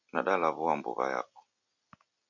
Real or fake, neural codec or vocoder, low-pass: real; none; 7.2 kHz